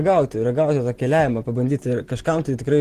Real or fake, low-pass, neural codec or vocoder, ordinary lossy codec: real; 14.4 kHz; none; Opus, 16 kbps